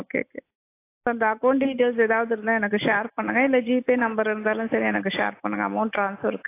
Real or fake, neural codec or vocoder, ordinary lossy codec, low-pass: real; none; AAC, 24 kbps; 3.6 kHz